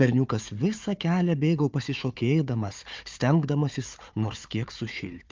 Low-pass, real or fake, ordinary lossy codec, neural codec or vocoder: 7.2 kHz; fake; Opus, 24 kbps; codec, 16 kHz, 4 kbps, FunCodec, trained on Chinese and English, 50 frames a second